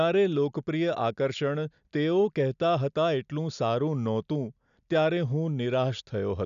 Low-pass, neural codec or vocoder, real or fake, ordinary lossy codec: 7.2 kHz; none; real; none